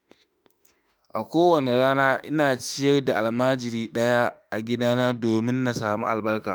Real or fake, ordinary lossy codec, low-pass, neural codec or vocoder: fake; none; none; autoencoder, 48 kHz, 32 numbers a frame, DAC-VAE, trained on Japanese speech